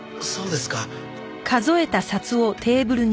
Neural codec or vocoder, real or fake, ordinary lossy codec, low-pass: none; real; none; none